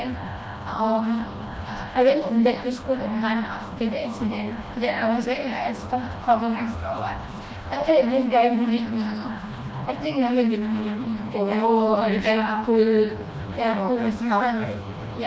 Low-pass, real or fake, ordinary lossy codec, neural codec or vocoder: none; fake; none; codec, 16 kHz, 1 kbps, FreqCodec, smaller model